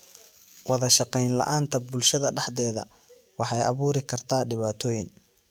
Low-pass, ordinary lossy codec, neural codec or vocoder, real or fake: none; none; codec, 44.1 kHz, 7.8 kbps, DAC; fake